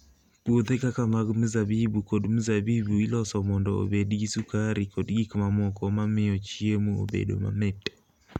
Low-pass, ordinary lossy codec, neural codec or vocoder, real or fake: 19.8 kHz; none; none; real